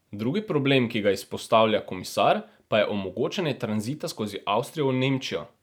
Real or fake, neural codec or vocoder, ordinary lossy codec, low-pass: real; none; none; none